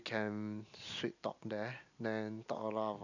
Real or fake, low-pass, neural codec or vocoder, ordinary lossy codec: real; 7.2 kHz; none; none